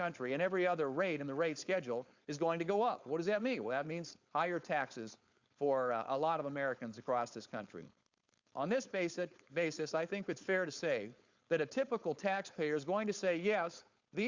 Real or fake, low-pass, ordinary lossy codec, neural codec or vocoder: fake; 7.2 kHz; Opus, 64 kbps; codec, 16 kHz, 4.8 kbps, FACodec